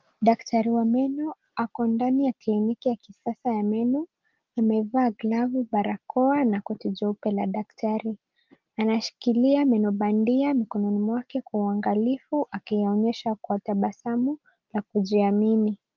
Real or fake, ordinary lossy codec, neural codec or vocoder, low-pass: real; Opus, 32 kbps; none; 7.2 kHz